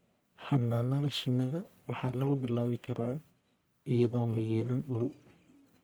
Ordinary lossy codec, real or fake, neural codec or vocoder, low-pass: none; fake; codec, 44.1 kHz, 1.7 kbps, Pupu-Codec; none